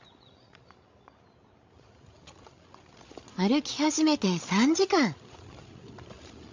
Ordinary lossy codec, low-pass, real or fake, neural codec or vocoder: MP3, 48 kbps; 7.2 kHz; fake; codec, 16 kHz, 16 kbps, FreqCodec, larger model